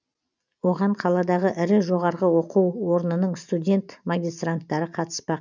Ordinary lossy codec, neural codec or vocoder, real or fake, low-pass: none; none; real; 7.2 kHz